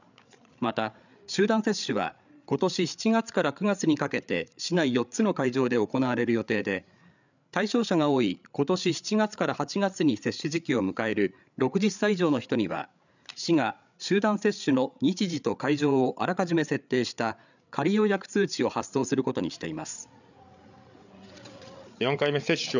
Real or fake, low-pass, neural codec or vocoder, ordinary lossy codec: fake; 7.2 kHz; codec, 16 kHz, 8 kbps, FreqCodec, larger model; none